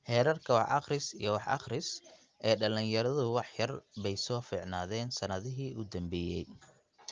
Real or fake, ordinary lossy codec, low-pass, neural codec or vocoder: real; Opus, 24 kbps; 7.2 kHz; none